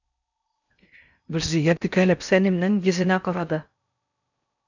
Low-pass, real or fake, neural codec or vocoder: 7.2 kHz; fake; codec, 16 kHz in and 24 kHz out, 0.6 kbps, FocalCodec, streaming, 4096 codes